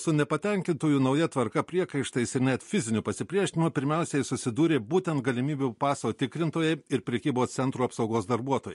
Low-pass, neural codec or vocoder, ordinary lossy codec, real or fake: 14.4 kHz; none; MP3, 48 kbps; real